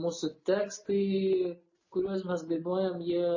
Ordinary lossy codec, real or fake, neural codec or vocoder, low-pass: MP3, 32 kbps; real; none; 7.2 kHz